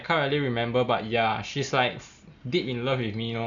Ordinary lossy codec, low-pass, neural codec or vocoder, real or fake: none; 7.2 kHz; none; real